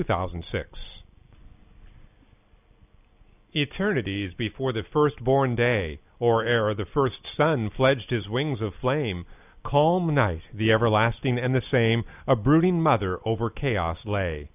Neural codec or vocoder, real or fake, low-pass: none; real; 3.6 kHz